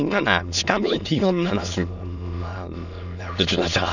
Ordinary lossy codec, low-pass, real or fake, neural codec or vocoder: none; 7.2 kHz; fake; autoencoder, 22.05 kHz, a latent of 192 numbers a frame, VITS, trained on many speakers